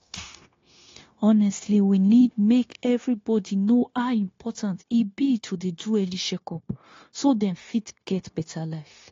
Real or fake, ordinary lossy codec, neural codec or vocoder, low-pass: fake; AAC, 32 kbps; codec, 16 kHz, 0.9 kbps, LongCat-Audio-Codec; 7.2 kHz